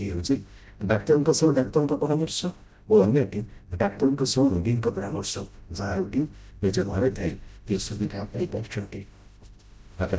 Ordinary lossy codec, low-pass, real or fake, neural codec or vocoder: none; none; fake; codec, 16 kHz, 0.5 kbps, FreqCodec, smaller model